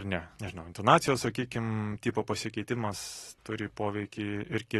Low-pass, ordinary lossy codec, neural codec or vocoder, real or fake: 19.8 kHz; AAC, 32 kbps; none; real